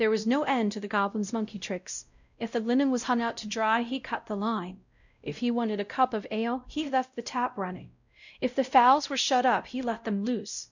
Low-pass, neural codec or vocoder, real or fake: 7.2 kHz; codec, 16 kHz, 0.5 kbps, X-Codec, WavLM features, trained on Multilingual LibriSpeech; fake